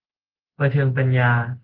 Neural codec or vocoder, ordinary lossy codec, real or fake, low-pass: none; Opus, 16 kbps; real; 5.4 kHz